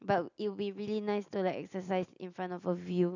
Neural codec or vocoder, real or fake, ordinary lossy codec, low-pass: none; real; none; 7.2 kHz